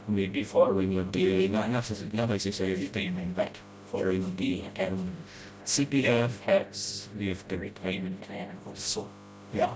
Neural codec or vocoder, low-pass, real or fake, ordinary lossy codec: codec, 16 kHz, 0.5 kbps, FreqCodec, smaller model; none; fake; none